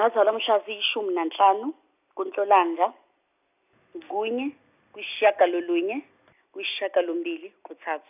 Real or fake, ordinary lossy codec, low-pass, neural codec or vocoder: real; none; 3.6 kHz; none